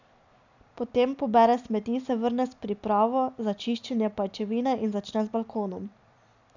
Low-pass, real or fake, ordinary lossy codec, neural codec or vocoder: 7.2 kHz; real; none; none